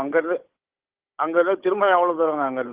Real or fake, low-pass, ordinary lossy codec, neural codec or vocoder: fake; 3.6 kHz; Opus, 16 kbps; codec, 16 kHz, 16 kbps, FunCodec, trained on Chinese and English, 50 frames a second